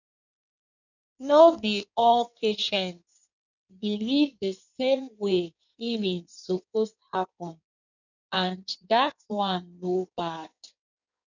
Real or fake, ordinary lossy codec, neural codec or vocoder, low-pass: fake; none; codec, 16 kHz in and 24 kHz out, 1.1 kbps, FireRedTTS-2 codec; 7.2 kHz